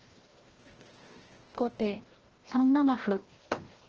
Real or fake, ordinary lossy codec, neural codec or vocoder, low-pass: fake; Opus, 16 kbps; codec, 16 kHz, 1 kbps, FreqCodec, larger model; 7.2 kHz